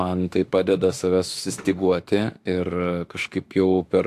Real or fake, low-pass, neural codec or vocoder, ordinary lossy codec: fake; 14.4 kHz; autoencoder, 48 kHz, 32 numbers a frame, DAC-VAE, trained on Japanese speech; AAC, 64 kbps